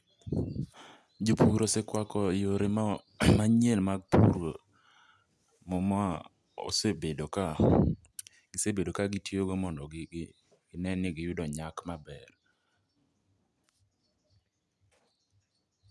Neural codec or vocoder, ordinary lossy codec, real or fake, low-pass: none; none; real; none